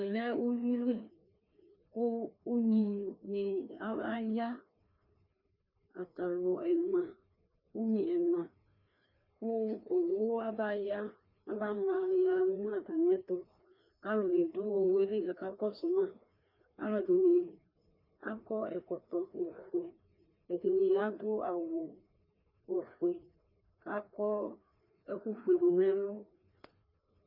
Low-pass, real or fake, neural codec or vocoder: 5.4 kHz; fake; codec, 16 kHz, 2 kbps, FreqCodec, larger model